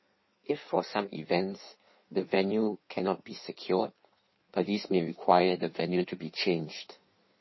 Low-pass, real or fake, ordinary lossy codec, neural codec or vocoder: 7.2 kHz; fake; MP3, 24 kbps; codec, 16 kHz in and 24 kHz out, 1.1 kbps, FireRedTTS-2 codec